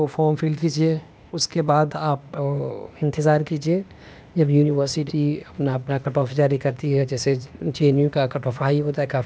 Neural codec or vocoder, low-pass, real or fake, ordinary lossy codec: codec, 16 kHz, 0.8 kbps, ZipCodec; none; fake; none